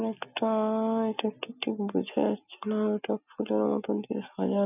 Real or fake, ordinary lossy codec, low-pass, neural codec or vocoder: real; none; 3.6 kHz; none